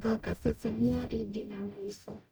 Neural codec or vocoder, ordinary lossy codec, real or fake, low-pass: codec, 44.1 kHz, 0.9 kbps, DAC; none; fake; none